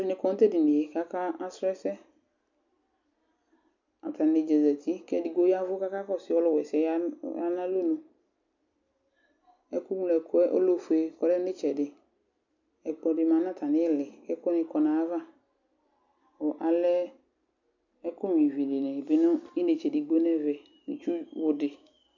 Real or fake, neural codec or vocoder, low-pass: real; none; 7.2 kHz